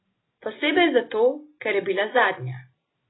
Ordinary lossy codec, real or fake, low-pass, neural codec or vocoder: AAC, 16 kbps; real; 7.2 kHz; none